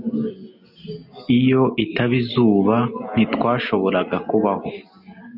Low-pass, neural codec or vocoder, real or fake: 5.4 kHz; none; real